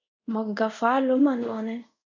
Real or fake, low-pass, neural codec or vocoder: fake; 7.2 kHz; codec, 24 kHz, 0.5 kbps, DualCodec